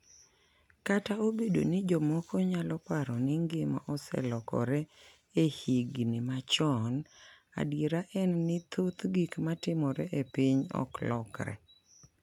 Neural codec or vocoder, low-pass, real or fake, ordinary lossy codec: none; 19.8 kHz; real; none